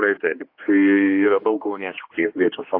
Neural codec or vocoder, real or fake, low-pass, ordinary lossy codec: codec, 16 kHz, 2 kbps, X-Codec, HuBERT features, trained on general audio; fake; 5.4 kHz; AAC, 32 kbps